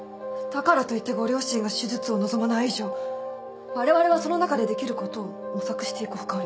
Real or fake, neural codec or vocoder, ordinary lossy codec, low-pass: real; none; none; none